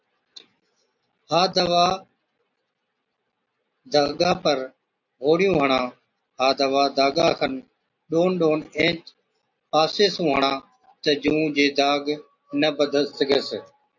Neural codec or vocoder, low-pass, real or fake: none; 7.2 kHz; real